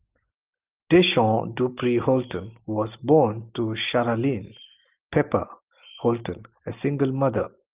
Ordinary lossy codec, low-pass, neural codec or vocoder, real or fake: Opus, 32 kbps; 3.6 kHz; none; real